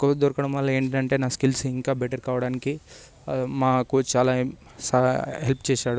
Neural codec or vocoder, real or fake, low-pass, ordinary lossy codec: none; real; none; none